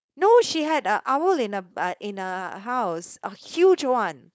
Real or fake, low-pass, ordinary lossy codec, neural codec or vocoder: fake; none; none; codec, 16 kHz, 4.8 kbps, FACodec